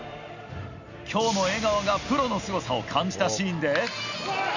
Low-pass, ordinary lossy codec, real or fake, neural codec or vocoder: 7.2 kHz; none; real; none